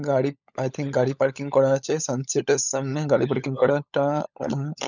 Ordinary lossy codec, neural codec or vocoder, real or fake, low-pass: none; codec, 16 kHz, 16 kbps, FunCodec, trained on LibriTTS, 50 frames a second; fake; 7.2 kHz